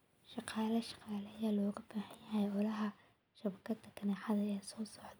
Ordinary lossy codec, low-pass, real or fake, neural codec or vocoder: none; none; real; none